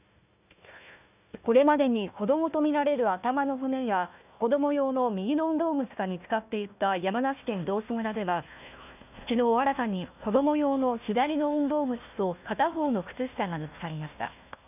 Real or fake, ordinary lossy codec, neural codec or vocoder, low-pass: fake; none; codec, 16 kHz, 1 kbps, FunCodec, trained on Chinese and English, 50 frames a second; 3.6 kHz